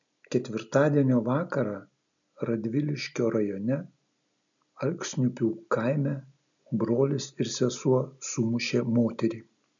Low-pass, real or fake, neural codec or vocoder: 7.2 kHz; real; none